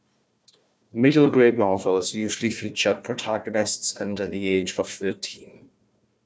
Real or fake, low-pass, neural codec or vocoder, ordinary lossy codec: fake; none; codec, 16 kHz, 1 kbps, FunCodec, trained on Chinese and English, 50 frames a second; none